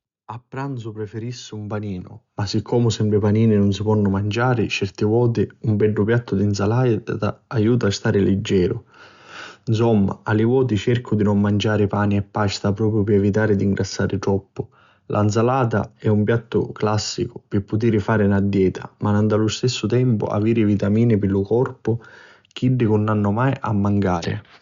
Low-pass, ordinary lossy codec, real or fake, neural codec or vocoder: 7.2 kHz; Opus, 64 kbps; real; none